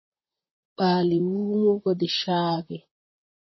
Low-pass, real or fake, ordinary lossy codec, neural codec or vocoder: 7.2 kHz; fake; MP3, 24 kbps; vocoder, 44.1 kHz, 128 mel bands, Pupu-Vocoder